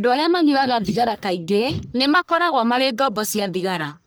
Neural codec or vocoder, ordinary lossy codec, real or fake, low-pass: codec, 44.1 kHz, 1.7 kbps, Pupu-Codec; none; fake; none